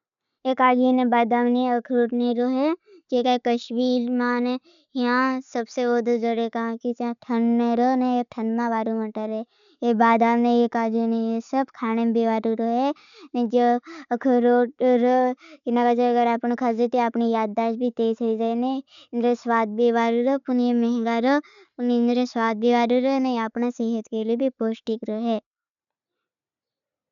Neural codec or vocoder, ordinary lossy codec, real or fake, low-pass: none; none; real; 7.2 kHz